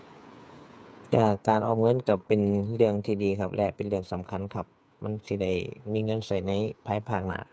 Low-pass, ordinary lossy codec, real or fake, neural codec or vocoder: none; none; fake; codec, 16 kHz, 8 kbps, FreqCodec, smaller model